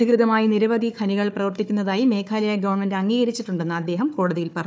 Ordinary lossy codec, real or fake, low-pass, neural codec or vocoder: none; fake; none; codec, 16 kHz, 4 kbps, FunCodec, trained on Chinese and English, 50 frames a second